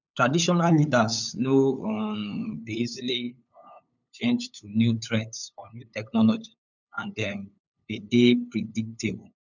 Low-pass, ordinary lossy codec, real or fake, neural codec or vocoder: 7.2 kHz; none; fake; codec, 16 kHz, 8 kbps, FunCodec, trained on LibriTTS, 25 frames a second